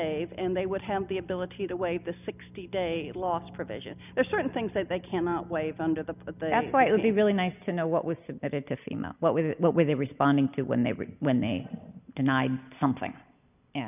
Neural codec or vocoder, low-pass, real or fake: none; 3.6 kHz; real